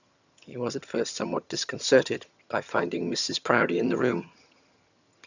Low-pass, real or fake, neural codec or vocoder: 7.2 kHz; fake; vocoder, 22.05 kHz, 80 mel bands, HiFi-GAN